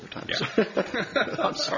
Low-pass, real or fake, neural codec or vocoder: 7.2 kHz; real; none